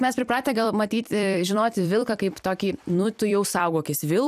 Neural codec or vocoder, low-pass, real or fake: vocoder, 44.1 kHz, 128 mel bands every 256 samples, BigVGAN v2; 14.4 kHz; fake